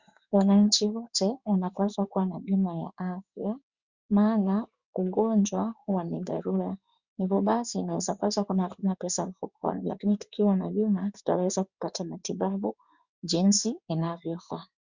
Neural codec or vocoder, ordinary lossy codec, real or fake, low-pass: codec, 16 kHz in and 24 kHz out, 1 kbps, XY-Tokenizer; Opus, 64 kbps; fake; 7.2 kHz